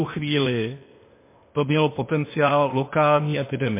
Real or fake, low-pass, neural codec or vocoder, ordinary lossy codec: fake; 3.6 kHz; codec, 16 kHz, 0.8 kbps, ZipCodec; MP3, 24 kbps